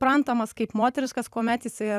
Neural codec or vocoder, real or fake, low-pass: vocoder, 44.1 kHz, 128 mel bands every 256 samples, BigVGAN v2; fake; 14.4 kHz